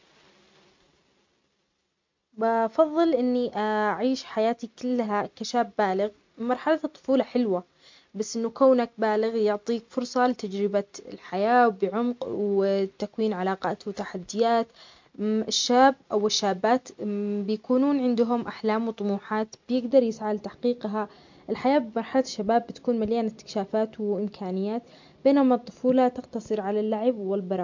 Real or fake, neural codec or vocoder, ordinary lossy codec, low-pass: real; none; MP3, 48 kbps; 7.2 kHz